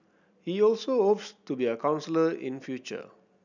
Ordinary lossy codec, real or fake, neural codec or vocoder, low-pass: none; real; none; 7.2 kHz